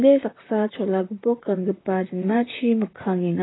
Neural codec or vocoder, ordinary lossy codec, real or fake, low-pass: vocoder, 44.1 kHz, 128 mel bands, Pupu-Vocoder; AAC, 16 kbps; fake; 7.2 kHz